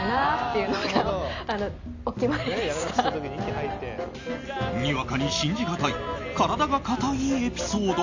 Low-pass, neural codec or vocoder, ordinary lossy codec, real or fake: 7.2 kHz; none; AAC, 48 kbps; real